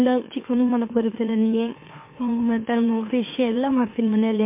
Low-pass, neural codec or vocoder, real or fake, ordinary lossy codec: 3.6 kHz; autoencoder, 44.1 kHz, a latent of 192 numbers a frame, MeloTTS; fake; MP3, 24 kbps